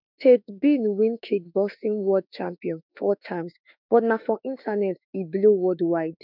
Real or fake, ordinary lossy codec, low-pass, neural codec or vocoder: fake; none; 5.4 kHz; autoencoder, 48 kHz, 32 numbers a frame, DAC-VAE, trained on Japanese speech